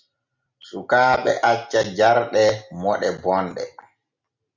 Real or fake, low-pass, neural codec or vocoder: real; 7.2 kHz; none